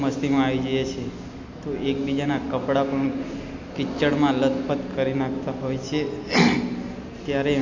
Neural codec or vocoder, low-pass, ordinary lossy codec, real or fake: none; 7.2 kHz; MP3, 64 kbps; real